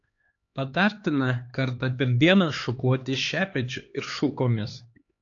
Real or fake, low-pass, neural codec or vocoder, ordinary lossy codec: fake; 7.2 kHz; codec, 16 kHz, 2 kbps, X-Codec, HuBERT features, trained on LibriSpeech; AAC, 48 kbps